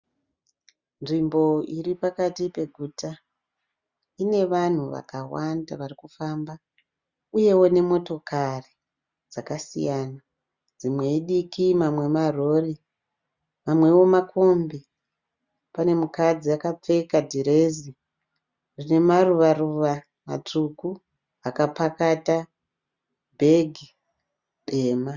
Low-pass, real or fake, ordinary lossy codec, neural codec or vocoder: 7.2 kHz; real; Opus, 64 kbps; none